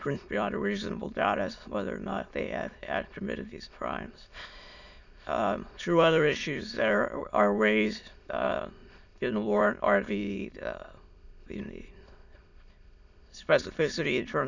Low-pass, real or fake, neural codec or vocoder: 7.2 kHz; fake; autoencoder, 22.05 kHz, a latent of 192 numbers a frame, VITS, trained on many speakers